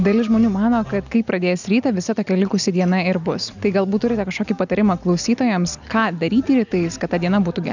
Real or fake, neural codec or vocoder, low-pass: real; none; 7.2 kHz